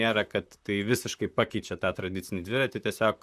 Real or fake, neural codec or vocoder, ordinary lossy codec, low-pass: fake; vocoder, 44.1 kHz, 128 mel bands, Pupu-Vocoder; Opus, 64 kbps; 14.4 kHz